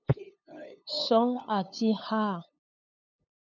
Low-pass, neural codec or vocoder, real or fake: 7.2 kHz; codec, 16 kHz, 8 kbps, FunCodec, trained on LibriTTS, 25 frames a second; fake